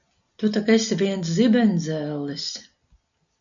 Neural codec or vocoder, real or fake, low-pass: none; real; 7.2 kHz